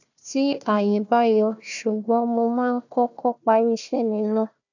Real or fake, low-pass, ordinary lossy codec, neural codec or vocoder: fake; 7.2 kHz; none; codec, 16 kHz, 1 kbps, FunCodec, trained on Chinese and English, 50 frames a second